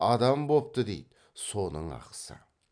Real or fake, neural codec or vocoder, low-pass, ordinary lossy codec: real; none; 9.9 kHz; none